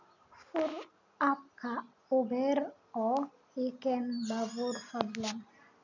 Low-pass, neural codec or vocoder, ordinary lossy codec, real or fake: 7.2 kHz; none; none; real